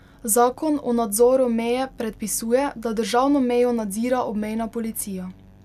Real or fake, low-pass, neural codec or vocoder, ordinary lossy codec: real; 14.4 kHz; none; none